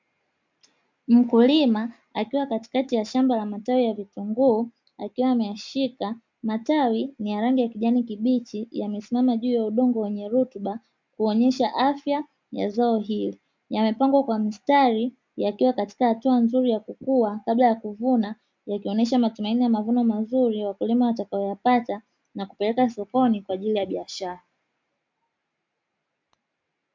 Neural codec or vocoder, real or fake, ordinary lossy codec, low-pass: none; real; MP3, 64 kbps; 7.2 kHz